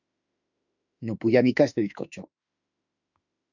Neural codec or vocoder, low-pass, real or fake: autoencoder, 48 kHz, 32 numbers a frame, DAC-VAE, trained on Japanese speech; 7.2 kHz; fake